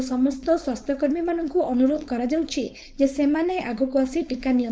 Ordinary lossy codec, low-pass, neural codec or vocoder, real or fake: none; none; codec, 16 kHz, 4.8 kbps, FACodec; fake